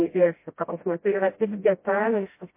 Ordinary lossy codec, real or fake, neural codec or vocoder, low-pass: MP3, 24 kbps; fake; codec, 16 kHz, 0.5 kbps, FreqCodec, smaller model; 3.6 kHz